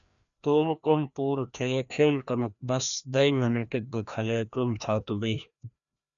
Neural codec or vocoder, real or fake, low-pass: codec, 16 kHz, 1 kbps, FreqCodec, larger model; fake; 7.2 kHz